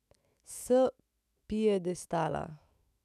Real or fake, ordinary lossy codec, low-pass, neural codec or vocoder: fake; none; 14.4 kHz; autoencoder, 48 kHz, 128 numbers a frame, DAC-VAE, trained on Japanese speech